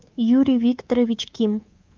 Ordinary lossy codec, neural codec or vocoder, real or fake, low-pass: Opus, 24 kbps; codec, 24 kHz, 3.1 kbps, DualCodec; fake; 7.2 kHz